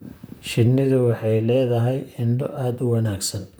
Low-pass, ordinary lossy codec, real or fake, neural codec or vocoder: none; none; fake; vocoder, 44.1 kHz, 128 mel bands, Pupu-Vocoder